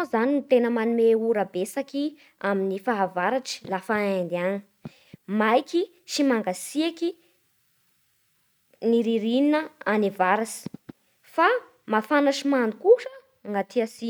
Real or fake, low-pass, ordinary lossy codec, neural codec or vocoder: real; none; none; none